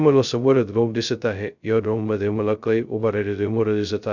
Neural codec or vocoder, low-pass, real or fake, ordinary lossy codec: codec, 16 kHz, 0.2 kbps, FocalCodec; 7.2 kHz; fake; none